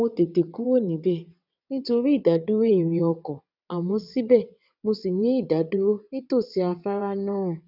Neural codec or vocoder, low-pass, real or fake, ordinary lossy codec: vocoder, 22.05 kHz, 80 mel bands, WaveNeXt; 5.4 kHz; fake; none